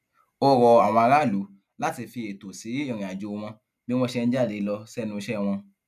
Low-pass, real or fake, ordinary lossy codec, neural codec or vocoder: 14.4 kHz; real; none; none